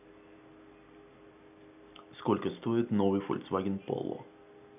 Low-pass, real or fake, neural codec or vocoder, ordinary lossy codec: 3.6 kHz; real; none; none